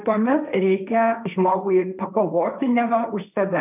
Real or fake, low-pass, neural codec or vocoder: fake; 3.6 kHz; codec, 16 kHz, 1.1 kbps, Voila-Tokenizer